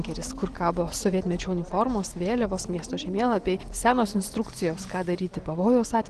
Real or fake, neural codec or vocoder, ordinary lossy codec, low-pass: real; none; Opus, 16 kbps; 10.8 kHz